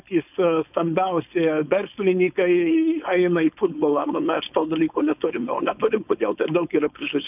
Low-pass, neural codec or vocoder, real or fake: 3.6 kHz; codec, 16 kHz, 4.8 kbps, FACodec; fake